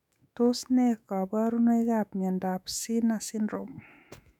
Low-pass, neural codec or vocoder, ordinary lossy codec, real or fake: 19.8 kHz; autoencoder, 48 kHz, 128 numbers a frame, DAC-VAE, trained on Japanese speech; none; fake